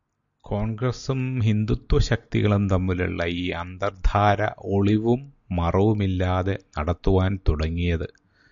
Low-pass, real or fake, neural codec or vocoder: 7.2 kHz; real; none